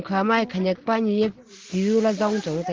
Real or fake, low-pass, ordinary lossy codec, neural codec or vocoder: real; 7.2 kHz; Opus, 16 kbps; none